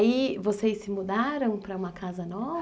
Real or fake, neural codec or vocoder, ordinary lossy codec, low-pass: real; none; none; none